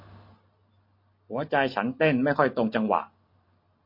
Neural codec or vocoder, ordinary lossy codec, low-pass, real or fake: none; MP3, 32 kbps; 5.4 kHz; real